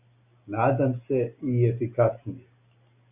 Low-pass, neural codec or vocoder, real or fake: 3.6 kHz; none; real